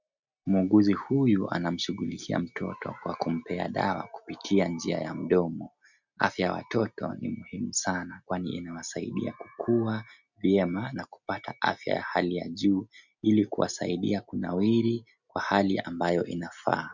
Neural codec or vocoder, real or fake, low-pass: none; real; 7.2 kHz